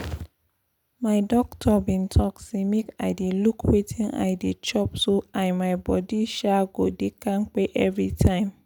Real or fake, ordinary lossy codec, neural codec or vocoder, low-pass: real; none; none; 19.8 kHz